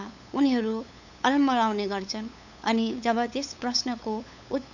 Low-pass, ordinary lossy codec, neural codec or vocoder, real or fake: 7.2 kHz; none; codec, 16 kHz, 8 kbps, FunCodec, trained on LibriTTS, 25 frames a second; fake